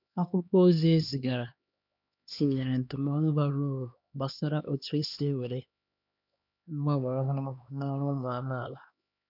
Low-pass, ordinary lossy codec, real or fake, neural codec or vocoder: 5.4 kHz; none; fake; codec, 16 kHz, 2 kbps, X-Codec, HuBERT features, trained on LibriSpeech